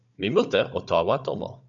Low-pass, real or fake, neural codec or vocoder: 7.2 kHz; fake; codec, 16 kHz, 16 kbps, FunCodec, trained on Chinese and English, 50 frames a second